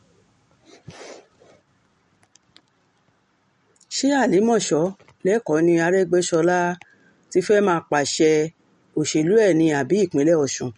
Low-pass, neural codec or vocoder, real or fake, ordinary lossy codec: 19.8 kHz; none; real; MP3, 48 kbps